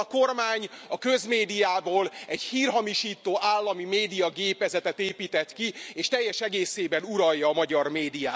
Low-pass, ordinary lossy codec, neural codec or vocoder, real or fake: none; none; none; real